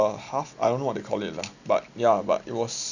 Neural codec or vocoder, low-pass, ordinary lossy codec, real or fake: none; 7.2 kHz; none; real